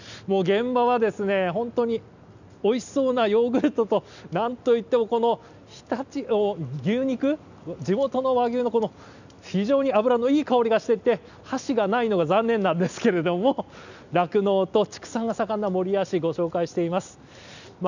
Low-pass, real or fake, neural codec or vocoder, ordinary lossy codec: 7.2 kHz; real; none; none